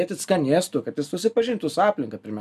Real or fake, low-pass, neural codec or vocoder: real; 14.4 kHz; none